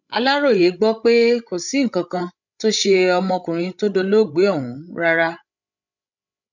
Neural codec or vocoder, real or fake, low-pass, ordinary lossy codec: codec, 16 kHz, 16 kbps, FreqCodec, larger model; fake; 7.2 kHz; none